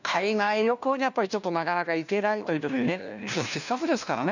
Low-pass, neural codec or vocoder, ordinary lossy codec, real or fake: 7.2 kHz; codec, 16 kHz, 1 kbps, FunCodec, trained on LibriTTS, 50 frames a second; none; fake